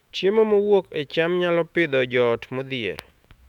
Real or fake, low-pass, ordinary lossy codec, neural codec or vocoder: fake; 19.8 kHz; none; autoencoder, 48 kHz, 128 numbers a frame, DAC-VAE, trained on Japanese speech